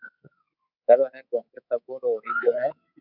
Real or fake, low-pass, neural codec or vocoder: fake; 5.4 kHz; codec, 24 kHz, 3.1 kbps, DualCodec